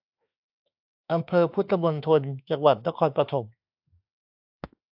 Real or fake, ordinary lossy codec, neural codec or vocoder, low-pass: fake; AAC, 48 kbps; autoencoder, 48 kHz, 32 numbers a frame, DAC-VAE, trained on Japanese speech; 5.4 kHz